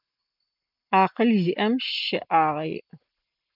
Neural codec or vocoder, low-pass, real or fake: none; 5.4 kHz; real